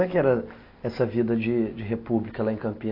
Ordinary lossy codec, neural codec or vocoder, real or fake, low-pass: AAC, 32 kbps; none; real; 5.4 kHz